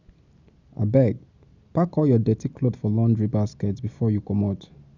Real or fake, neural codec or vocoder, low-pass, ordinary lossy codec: real; none; 7.2 kHz; none